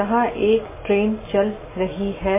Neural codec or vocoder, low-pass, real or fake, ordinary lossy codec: none; 3.6 kHz; real; MP3, 16 kbps